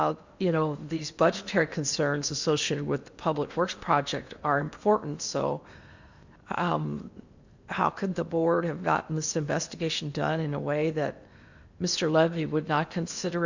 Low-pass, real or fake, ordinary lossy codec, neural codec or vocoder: 7.2 kHz; fake; Opus, 64 kbps; codec, 16 kHz in and 24 kHz out, 0.8 kbps, FocalCodec, streaming, 65536 codes